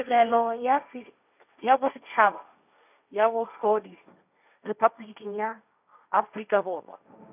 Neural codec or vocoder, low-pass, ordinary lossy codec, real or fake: codec, 16 kHz, 1.1 kbps, Voila-Tokenizer; 3.6 kHz; none; fake